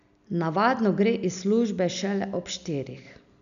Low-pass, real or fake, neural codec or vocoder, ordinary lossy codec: 7.2 kHz; real; none; none